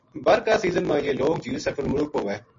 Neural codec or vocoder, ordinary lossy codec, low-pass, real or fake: none; MP3, 32 kbps; 7.2 kHz; real